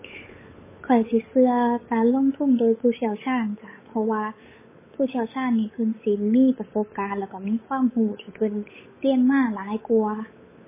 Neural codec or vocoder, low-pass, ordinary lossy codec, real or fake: codec, 16 kHz, 8 kbps, FunCodec, trained on Chinese and English, 25 frames a second; 3.6 kHz; MP3, 16 kbps; fake